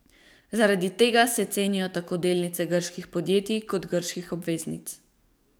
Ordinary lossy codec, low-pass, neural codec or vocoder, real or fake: none; none; codec, 44.1 kHz, 7.8 kbps, DAC; fake